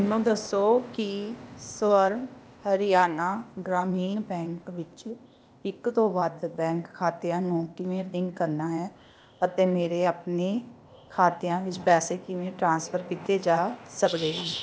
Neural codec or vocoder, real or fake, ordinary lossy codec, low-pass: codec, 16 kHz, 0.8 kbps, ZipCodec; fake; none; none